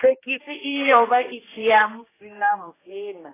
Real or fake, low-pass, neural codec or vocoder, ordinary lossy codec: fake; 3.6 kHz; codec, 16 kHz, 1 kbps, X-Codec, HuBERT features, trained on general audio; AAC, 16 kbps